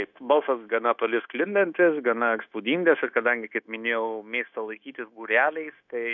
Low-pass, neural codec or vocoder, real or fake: 7.2 kHz; codec, 16 kHz, 0.9 kbps, LongCat-Audio-Codec; fake